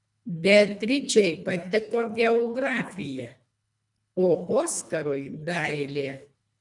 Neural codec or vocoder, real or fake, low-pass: codec, 24 kHz, 1.5 kbps, HILCodec; fake; 10.8 kHz